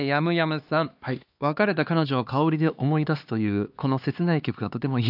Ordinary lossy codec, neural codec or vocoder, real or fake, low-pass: AAC, 48 kbps; codec, 16 kHz, 2 kbps, X-Codec, HuBERT features, trained on LibriSpeech; fake; 5.4 kHz